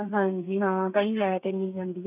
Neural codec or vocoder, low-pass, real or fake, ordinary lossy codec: codec, 32 kHz, 1.9 kbps, SNAC; 3.6 kHz; fake; none